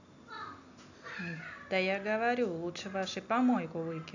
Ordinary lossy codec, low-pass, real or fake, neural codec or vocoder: none; 7.2 kHz; real; none